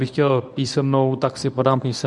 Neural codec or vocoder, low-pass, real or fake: codec, 24 kHz, 0.9 kbps, WavTokenizer, medium speech release version 1; 10.8 kHz; fake